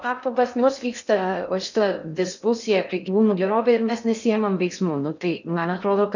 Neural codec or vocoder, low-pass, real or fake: codec, 16 kHz in and 24 kHz out, 0.6 kbps, FocalCodec, streaming, 2048 codes; 7.2 kHz; fake